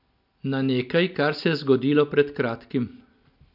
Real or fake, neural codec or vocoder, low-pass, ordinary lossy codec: real; none; 5.4 kHz; none